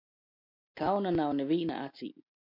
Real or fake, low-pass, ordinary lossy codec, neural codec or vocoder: real; 5.4 kHz; MP3, 48 kbps; none